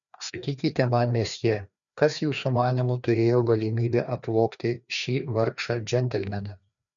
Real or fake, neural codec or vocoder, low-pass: fake; codec, 16 kHz, 2 kbps, FreqCodec, larger model; 7.2 kHz